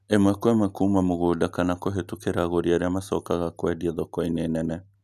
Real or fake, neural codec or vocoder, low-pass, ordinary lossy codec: real; none; 14.4 kHz; none